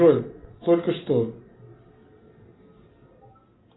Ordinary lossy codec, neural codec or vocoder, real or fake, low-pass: AAC, 16 kbps; none; real; 7.2 kHz